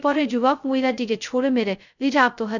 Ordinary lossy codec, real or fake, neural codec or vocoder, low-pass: none; fake; codec, 16 kHz, 0.2 kbps, FocalCodec; 7.2 kHz